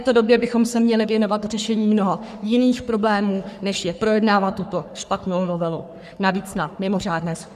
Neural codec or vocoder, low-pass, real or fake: codec, 44.1 kHz, 3.4 kbps, Pupu-Codec; 14.4 kHz; fake